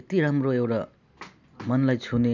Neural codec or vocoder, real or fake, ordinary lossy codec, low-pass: none; real; none; 7.2 kHz